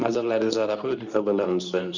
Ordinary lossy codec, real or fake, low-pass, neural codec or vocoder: none; fake; 7.2 kHz; codec, 24 kHz, 0.9 kbps, WavTokenizer, medium speech release version 2